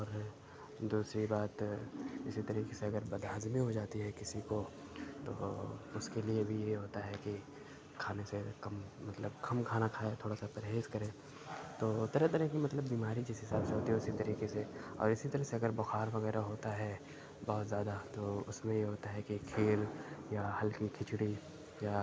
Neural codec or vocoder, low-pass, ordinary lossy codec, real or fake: none; none; none; real